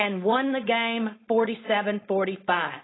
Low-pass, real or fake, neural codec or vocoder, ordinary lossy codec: 7.2 kHz; fake; codec, 16 kHz, 4.8 kbps, FACodec; AAC, 16 kbps